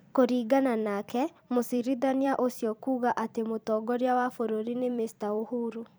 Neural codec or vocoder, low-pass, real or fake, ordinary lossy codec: vocoder, 44.1 kHz, 128 mel bands every 256 samples, BigVGAN v2; none; fake; none